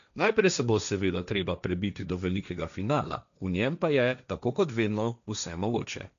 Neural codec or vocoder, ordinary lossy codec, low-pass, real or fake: codec, 16 kHz, 1.1 kbps, Voila-Tokenizer; none; 7.2 kHz; fake